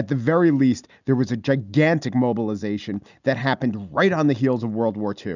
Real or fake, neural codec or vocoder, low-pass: real; none; 7.2 kHz